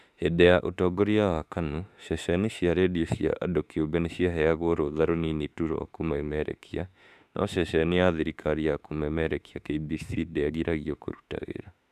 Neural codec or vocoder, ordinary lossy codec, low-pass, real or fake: autoencoder, 48 kHz, 32 numbers a frame, DAC-VAE, trained on Japanese speech; none; 14.4 kHz; fake